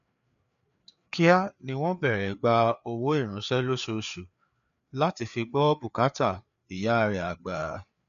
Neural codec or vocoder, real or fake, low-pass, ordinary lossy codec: codec, 16 kHz, 4 kbps, FreqCodec, larger model; fake; 7.2 kHz; none